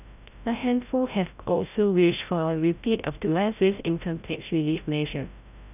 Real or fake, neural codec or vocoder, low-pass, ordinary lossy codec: fake; codec, 16 kHz, 0.5 kbps, FreqCodec, larger model; 3.6 kHz; none